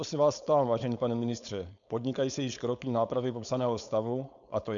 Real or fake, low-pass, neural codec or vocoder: fake; 7.2 kHz; codec, 16 kHz, 4.8 kbps, FACodec